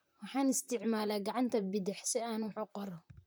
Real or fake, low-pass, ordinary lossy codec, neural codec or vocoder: fake; none; none; vocoder, 44.1 kHz, 128 mel bands, Pupu-Vocoder